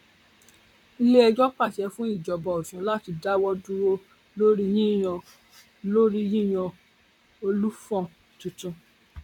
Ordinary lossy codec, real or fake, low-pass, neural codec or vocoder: none; fake; 19.8 kHz; vocoder, 44.1 kHz, 128 mel bands, Pupu-Vocoder